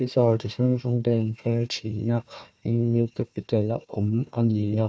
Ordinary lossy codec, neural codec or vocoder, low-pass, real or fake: none; codec, 16 kHz, 1 kbps, FunCodec, trained on Chinese and English, 50 frames a second; none; fake